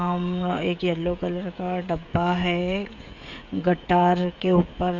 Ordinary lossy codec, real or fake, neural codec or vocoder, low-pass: Opus, 64 kbps; fake; codec, 16 kHz, 16 kbps, FreqCodec, smaller model; 7.2 kHz